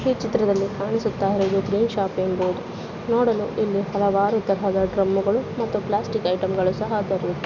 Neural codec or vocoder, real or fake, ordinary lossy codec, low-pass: none; real; none; 7.2 kHz